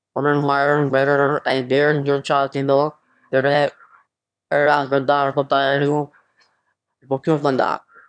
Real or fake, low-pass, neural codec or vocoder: fake; 9.9 kHz; autoencoder, 22.05 kHz, a latent of 192 numbers a frame, VITS, trained on one speaker